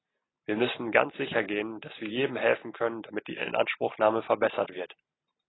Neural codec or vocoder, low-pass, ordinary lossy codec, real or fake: none; 7.2 kHz; AAC, 16 kbps; real